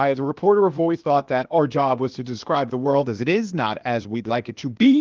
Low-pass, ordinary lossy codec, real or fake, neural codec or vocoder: 7.2 kHz; Opus, 32 kbps; fake; codec, 16 kHz, 0.8 kbps, ZipCodec